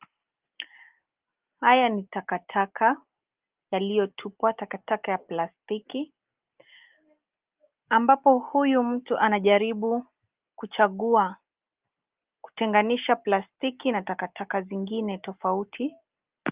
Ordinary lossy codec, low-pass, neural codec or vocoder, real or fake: Opus, 32 kbps; 3.6 kHz; none; real